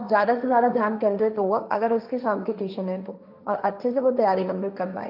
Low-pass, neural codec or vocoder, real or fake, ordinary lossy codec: 5.4 kHz; codec, 16 kHz, 1.1 kbps, Voila-Tokenizer; fake; none